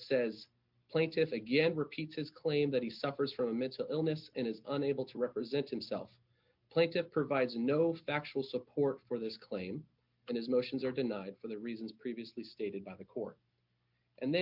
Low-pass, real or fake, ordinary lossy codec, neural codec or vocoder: 5.4 kHz; real; MP3, 48 kbps; none